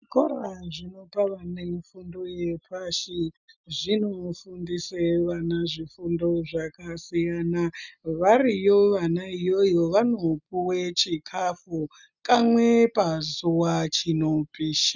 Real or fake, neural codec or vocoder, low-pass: real; none; 7.2 kHz